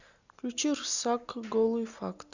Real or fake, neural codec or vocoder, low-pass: real; none; 7.2 kHz